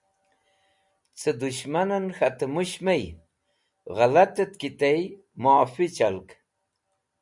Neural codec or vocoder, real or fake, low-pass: none; real; 10.8 kHz